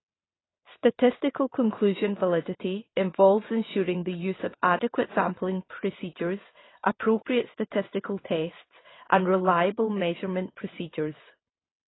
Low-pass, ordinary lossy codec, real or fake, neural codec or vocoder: 7.2 kHz; AAC, 16 kbps; fake; vocoder, 22.05 kHz, 80 mel bands, WaveNeXt